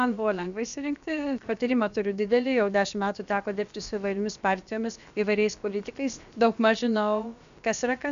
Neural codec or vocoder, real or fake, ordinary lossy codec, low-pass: codec, 16 kHz, about 1 kbps, DyCAST, with the encoder's durations; fake; AAC, 96 kbps; 7.2 kHz